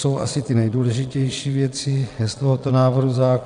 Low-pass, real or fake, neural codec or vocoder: 9.9 kHz; fake; vocoder, 22.05 kHz, 80 mel bands, Vocos